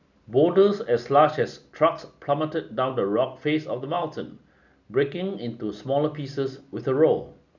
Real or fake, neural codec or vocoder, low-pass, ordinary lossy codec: real; none; 7.2 kHz; none